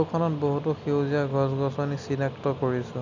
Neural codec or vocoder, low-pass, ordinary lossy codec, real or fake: none; 7.2 kHz; none; real